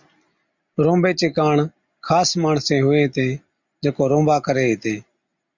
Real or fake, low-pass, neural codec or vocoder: real; 7.2 kHz; none